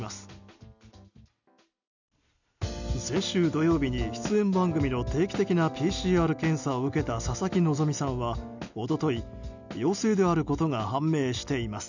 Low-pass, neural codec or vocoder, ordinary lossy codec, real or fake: 7.2 kHz; none; none; real